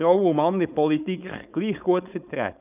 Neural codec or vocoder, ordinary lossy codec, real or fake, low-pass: codec, 16 kHz, 4.8 kbps, FACodec; none; fake; 3.6 kHz